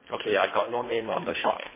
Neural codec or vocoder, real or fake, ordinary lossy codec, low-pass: codec, 24 kHz, 1.5 kbps, HILCodec; fake; MP3, 16 kbps; 3.6 kHz